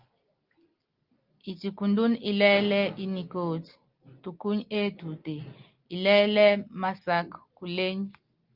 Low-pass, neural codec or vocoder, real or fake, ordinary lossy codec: 5.4 kHz; none; real; Opus, 32 kbps